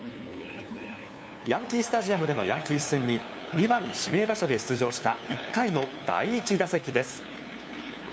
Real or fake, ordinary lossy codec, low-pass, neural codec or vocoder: fake; none; none; codec, 16 kHz, 2 kbps, FunCodec, trained on LibriTTS, 25 frames a second